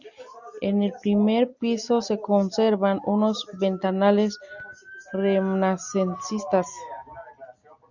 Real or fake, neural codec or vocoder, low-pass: real; none; 7.2 kHz